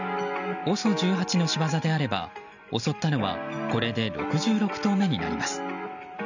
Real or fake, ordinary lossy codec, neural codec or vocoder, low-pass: real; none; none; 7.2 kHz